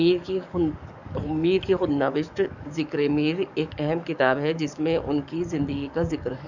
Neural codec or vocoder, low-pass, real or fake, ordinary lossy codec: codec, 44.1 kHz, 7.8 kbps, DAC; 7.2 kHz; fake; none